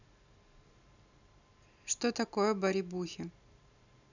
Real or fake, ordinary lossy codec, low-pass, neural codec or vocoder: real; none; 7.2 kHz; none